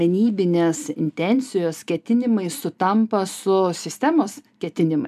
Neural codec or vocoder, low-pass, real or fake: none; 14.4 kHz; real